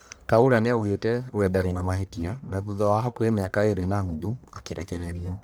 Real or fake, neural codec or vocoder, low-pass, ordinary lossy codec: fake; codec, 44.1 kHz, 1.7 kbps, Pupu-Codec; none; none